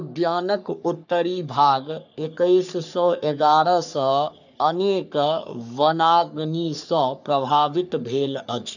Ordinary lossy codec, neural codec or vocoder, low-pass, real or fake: none; codec, 44.1 kHz, 3.4 kbps, Pupu-Codec; 7.2 kHz; fake